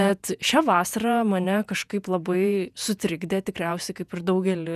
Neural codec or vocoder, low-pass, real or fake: vocoder, 48 kHz, 128 mel bands, Vocos; 14.4 kHz; fake